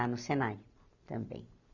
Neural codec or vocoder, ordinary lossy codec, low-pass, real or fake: none; none; 7.2 kHz; real